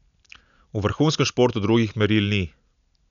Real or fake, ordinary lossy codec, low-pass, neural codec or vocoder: real; none; 7.2 kHz; none